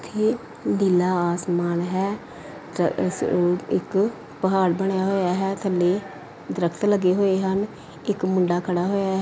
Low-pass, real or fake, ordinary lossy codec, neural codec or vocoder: none; real; none; none